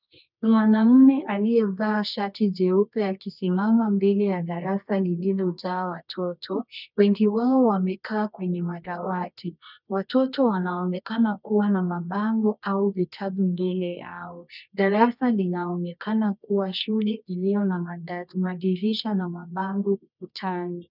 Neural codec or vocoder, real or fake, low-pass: codec, 24 kHz, 0.9 kbps, WavTokenizer, medium music audio release; fake; 5.4 kHz